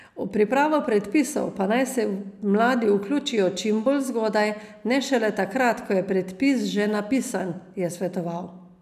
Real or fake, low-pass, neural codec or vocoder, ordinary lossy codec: real; 14.4 kHz; none; none